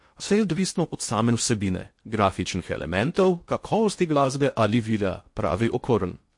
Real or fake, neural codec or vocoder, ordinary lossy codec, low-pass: fake; codec, 16 kHz in and 24 kHz out, 0.6 kbps, FocalCodec, streaming, 2048 codes; MP3, 48 kbps; 10.8 kHz